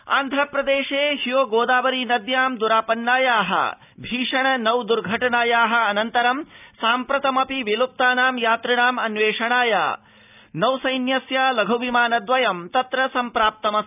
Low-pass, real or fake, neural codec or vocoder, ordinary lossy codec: 3.6 kHz; real; none; none